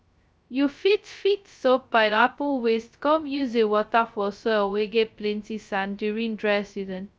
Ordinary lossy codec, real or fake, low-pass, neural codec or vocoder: none; fake; none; codec, 16 kHz, 0.2 kbps, FocalCodec